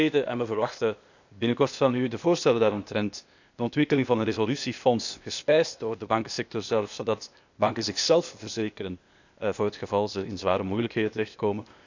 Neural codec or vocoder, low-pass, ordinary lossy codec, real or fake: codec, 16 kHz, 0.8 kbps, ZipCodec; 7.2 kHz; none; fake